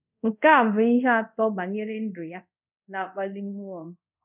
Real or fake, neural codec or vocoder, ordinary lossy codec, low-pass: fake; codec, 24 kHz, 0.5 kbps, DualCodec; none; 3.6 kHz